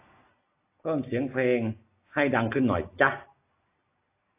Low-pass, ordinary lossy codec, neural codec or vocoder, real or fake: 3.6 kHz; AAC, 24 kbps; none; real